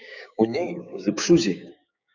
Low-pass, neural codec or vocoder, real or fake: 7.2 kHz; codec, 16 kHz, 6 kbps, DAC; fake